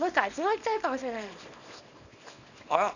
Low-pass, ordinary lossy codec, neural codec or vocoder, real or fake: 7.2 kHz; none; codec, 24 kHz, 0.9 kbps, WavTokenizer, small release; fake